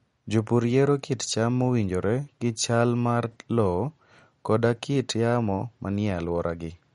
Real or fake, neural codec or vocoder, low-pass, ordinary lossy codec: real; none; 19.8 kHz; MP3, 48 kbps